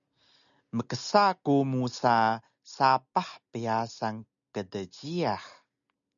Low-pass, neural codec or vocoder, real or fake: 7.2 kHz; none; real